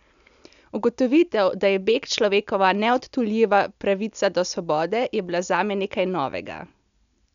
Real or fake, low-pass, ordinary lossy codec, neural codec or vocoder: real; 7.2 kHz; none; none